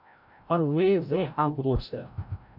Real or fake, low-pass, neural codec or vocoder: fake; 5.4 kHz; codec, 16 kHz, 0.5 kbps, FreqCodec, larger model